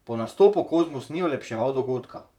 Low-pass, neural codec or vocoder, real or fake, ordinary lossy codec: 19.8 kHz; vocoder, 44.1 kHz, 128 mel bands, Pupu-Vocoder; fake; none